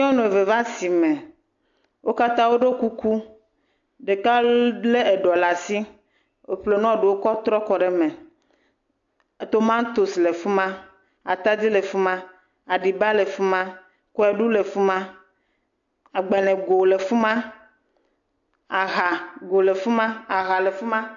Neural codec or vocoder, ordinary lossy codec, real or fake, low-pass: none; AAC, 64 kbps; real; 7.2 kHz